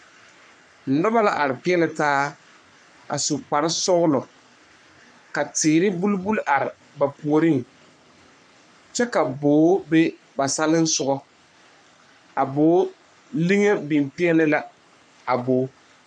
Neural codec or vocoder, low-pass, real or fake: codec, 44.1 kHz, 3.4 kbps, Pupu-Codec; 9.9 kHz; fake